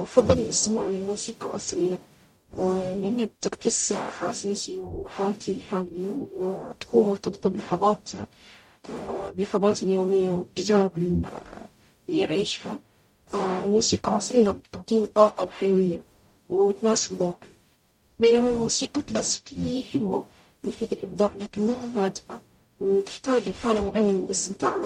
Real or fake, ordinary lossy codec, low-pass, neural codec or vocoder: fake; MP3, 64 kbps; 19.8 kHz; codec, 44.1 kHz, 0.9 kbps, DAC